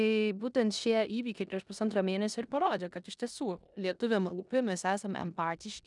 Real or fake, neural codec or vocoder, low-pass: fake; codec, 16 kHz in and 24 kHz out, 0.9 kbps, LongCat-Audio-Codec, four codebook decoder; 10.8 kHz